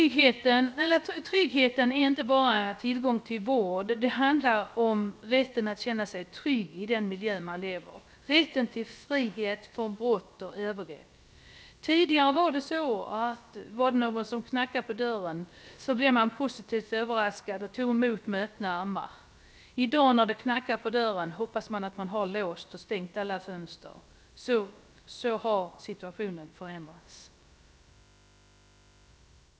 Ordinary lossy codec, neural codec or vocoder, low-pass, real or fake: none; codec, 16 kHz, about 1 kbps, DyCAST, with the encoder's durations; none; fake